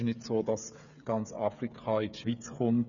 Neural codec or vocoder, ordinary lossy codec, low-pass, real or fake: codec, 16 kHz, 8 kbps, FreqCodec, smaller model; none; 7.2 kHz; fake